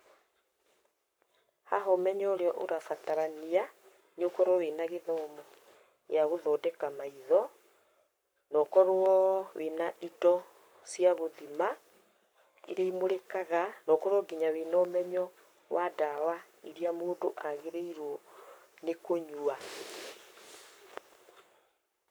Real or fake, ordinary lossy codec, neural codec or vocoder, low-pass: fake; none; codec, 44.1 kHz, 7.8 kbps, Pupu-Codec; none